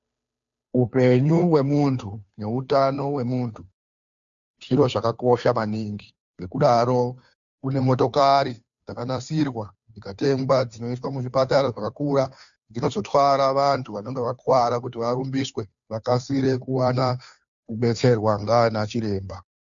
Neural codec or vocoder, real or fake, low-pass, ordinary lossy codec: codec, 16 kHz, 2 kbps, FunCodec, trained on Chinese and English, 25 frames a second; fake; 7.2 kHz; MP3, 64 kbps